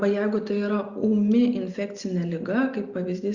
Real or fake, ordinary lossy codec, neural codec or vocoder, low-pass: real; Opus, 64 kbps; none; 7.2 kHz